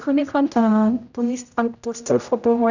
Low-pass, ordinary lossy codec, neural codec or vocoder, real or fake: 7.2 kHz; none; codec, 16 kHz, 0.5 kbps, X-Codec, HuBERT features, trained on general audio; fake